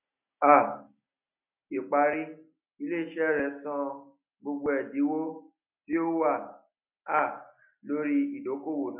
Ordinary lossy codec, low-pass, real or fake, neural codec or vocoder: none; 3.6 kHz; real; none